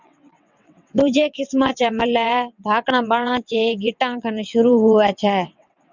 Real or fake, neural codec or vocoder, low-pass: fake; vocoder, 22.05 kHz, 80 mel bands, WaveNeXt; 7.2 kHz